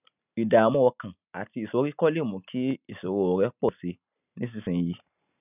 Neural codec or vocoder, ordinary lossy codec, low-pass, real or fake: none; none; 3.6 kHz; real